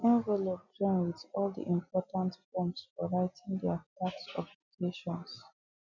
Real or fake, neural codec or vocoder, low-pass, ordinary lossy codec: real; none; 7.2 kHz; none